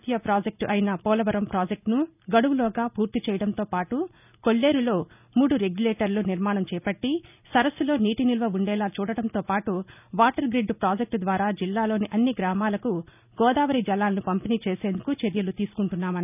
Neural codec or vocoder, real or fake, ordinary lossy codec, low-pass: none; real; none; 3.6 kHz